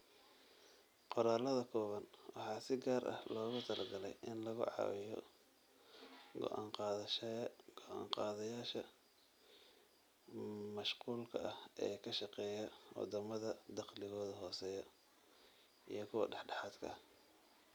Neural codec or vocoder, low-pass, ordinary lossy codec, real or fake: none; none; none; real